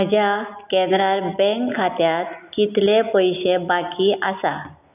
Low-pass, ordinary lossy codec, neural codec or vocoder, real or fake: 3.6 kHz; none; none; real